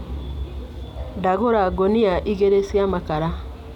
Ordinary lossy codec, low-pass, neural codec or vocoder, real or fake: none; 19.8 kHz; none; real